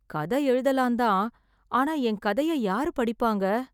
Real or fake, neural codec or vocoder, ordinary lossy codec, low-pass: real; none; none; 19.8 kHz